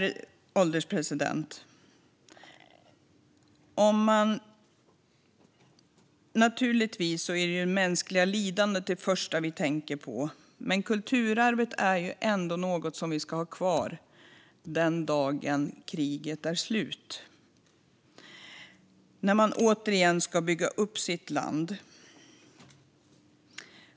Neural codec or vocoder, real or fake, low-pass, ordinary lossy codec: none; real; none; none